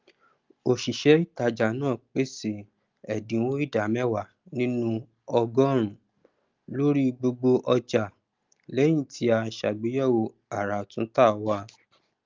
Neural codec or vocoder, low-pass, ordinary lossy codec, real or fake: none; 7.2 kHz; Opus, 32 kbps; real